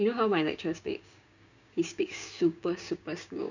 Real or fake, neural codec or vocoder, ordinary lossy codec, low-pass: fake; codec, 16 kHz, 6 kbps, DAC; none; 7.2 kHz